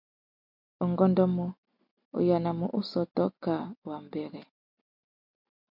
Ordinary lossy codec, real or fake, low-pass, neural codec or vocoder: MP3, 48 kbps; real; 5.4 kHz; none